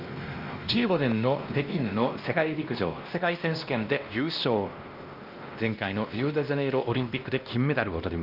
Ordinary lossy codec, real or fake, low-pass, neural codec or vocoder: Opus, 24 kbps; fake; 5.4 kHz; codec, 16 kHz, 1 kbps, X-Codec, WavLM features, trained on Multilingual LibriSpeech